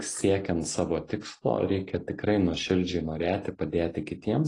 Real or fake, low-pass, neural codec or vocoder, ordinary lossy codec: real; 10.8 kHz; none; AAC, 32 kbps